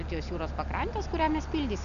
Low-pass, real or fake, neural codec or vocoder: 7.2 kHz; real; none